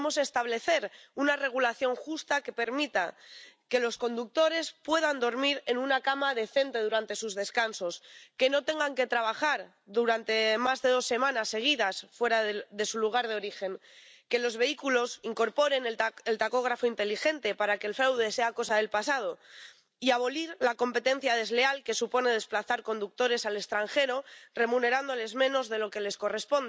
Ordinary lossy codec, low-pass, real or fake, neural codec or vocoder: none; none; real; none